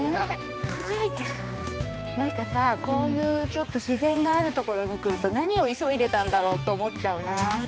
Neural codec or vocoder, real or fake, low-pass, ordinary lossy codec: codec, 16 kHz, 2 kbps, X-Codec, HuBERT features, trained on balanced general audio; fake; none; none